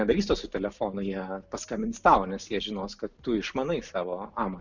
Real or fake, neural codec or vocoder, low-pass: real; none; 7.2 kHz